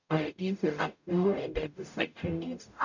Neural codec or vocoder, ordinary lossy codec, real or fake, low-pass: codec, 44.1 kHz, 0.9 kbps, DAC; none; fake; 7.2 kHz